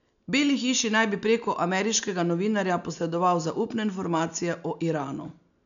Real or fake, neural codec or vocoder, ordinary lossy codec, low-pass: real; none; none; 7.2 kHz